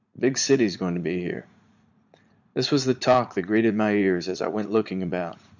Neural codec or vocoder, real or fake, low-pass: vocoder, 44.1 kHz, 80 mel bands, Vocos; fake; 7.2 kHz